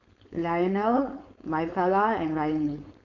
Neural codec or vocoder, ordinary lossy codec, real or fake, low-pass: codec, 16 kHz, 4.8 kbps, FACodec; none; fake; 7.2 kHz